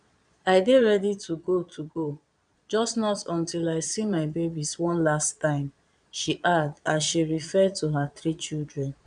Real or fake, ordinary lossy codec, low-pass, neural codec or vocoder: fake; none; 9.9 kHz; vocoder, 22.05 kHz, 80 mel bands, Vocos